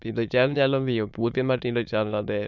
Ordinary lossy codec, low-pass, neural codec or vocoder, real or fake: none; 7.2 kHz; autoencoder, 22.05 kHz, a latent of 192 numbers a frame, VITS, trained on many speakers; fake